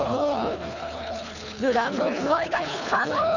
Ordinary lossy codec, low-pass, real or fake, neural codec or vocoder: none; 7.2 kHz; fake; codec, 24 kHz, 3 kbps, HILCodec